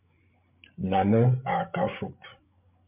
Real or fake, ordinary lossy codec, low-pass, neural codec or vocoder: fake; MP3, 32 kbps; 3.6 kHz; codec, 16 kHz, 8 kbps, FreqCodec, larger model